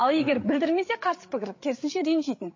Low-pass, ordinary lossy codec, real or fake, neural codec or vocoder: 7.2 kHz; MP3, 32 kbps; fake; codec, 16 kHz, 8 kbps, FreqCodec, larger model